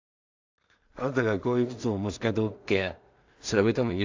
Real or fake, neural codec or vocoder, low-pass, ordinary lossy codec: fake; codec, 16 kHz in and 24 kHz out, 0.4 kbps, LongCat-Audio-Codec, two codebook decoder; 7.2 kHz; AAC, 48 kbps